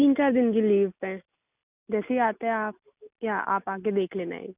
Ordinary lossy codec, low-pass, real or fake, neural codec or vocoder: none; 3.6 kHz; real; none